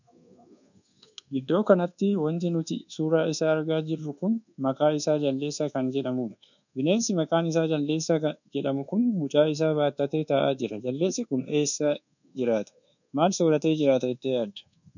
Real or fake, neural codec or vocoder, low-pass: fake; codec, 24 kHz, 1.2 kbps, DualCodec; 7.2 kHz